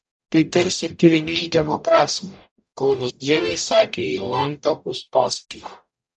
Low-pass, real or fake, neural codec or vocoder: 10.8 kHz; fake; codec, 44.1 kHz, 0.9 kbps, DAC